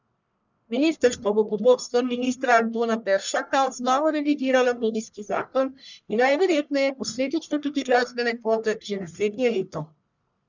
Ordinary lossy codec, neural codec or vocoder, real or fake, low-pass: none; codec, 44.1 kHz, 1.7 kbps, Pupu-Codec; fake; 7.2 kHz